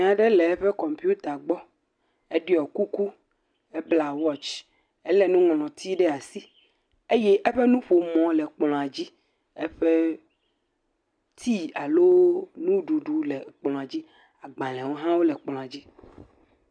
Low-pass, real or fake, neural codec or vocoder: 9.9 kHz; real; none